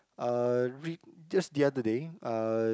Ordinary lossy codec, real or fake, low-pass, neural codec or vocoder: none; real; none; none